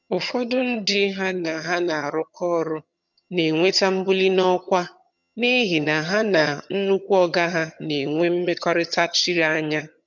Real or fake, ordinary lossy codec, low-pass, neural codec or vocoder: fake; none; 7.2 kHz; vocoder, 22.05 kHz, 80 mel bands, HiFi-GAN